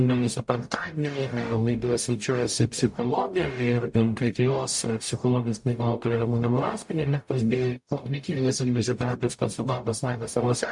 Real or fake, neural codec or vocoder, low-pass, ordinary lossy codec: fake; codec, 44.1 kHz, 0.9 kbps, DAC; 10.8 kHz; MP3, 64 kbps